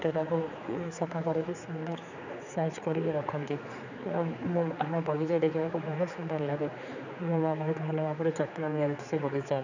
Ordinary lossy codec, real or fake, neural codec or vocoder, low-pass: none; fake; codec, 16 kHz, 4 kbps, X-Codec, HuBERT features, trained on general audio; 7.2 kHz